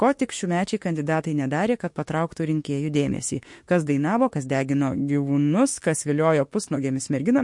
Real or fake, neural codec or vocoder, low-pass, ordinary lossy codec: fake; autoencoder, 48 kHz, 32 numbers a frame, DAC-VAE, trained on Japanese speech; 10.8 kHz; MP3, 48 kbps